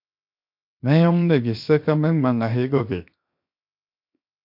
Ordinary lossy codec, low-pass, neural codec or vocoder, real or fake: MP3, 48 kbps; 5.4 kHz; codec, 16 kHz, 0.7 kbps, FocalCodec; fake